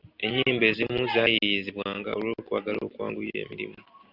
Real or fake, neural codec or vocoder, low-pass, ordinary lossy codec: real; none; 5.4 kHz; Opus, 64 kbps